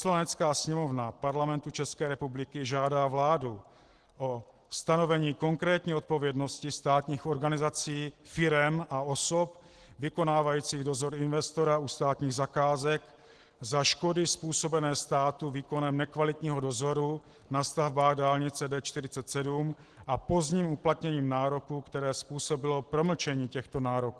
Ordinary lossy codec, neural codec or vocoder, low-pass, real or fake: Opus, 16 kbps; none; 10.8 kHz; real